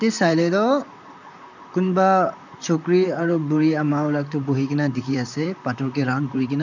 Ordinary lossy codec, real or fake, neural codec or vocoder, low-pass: none; fake; vocoder, 44.1 kHz, 128 mel bands, Pupu-Vocoder; 7.2 kHz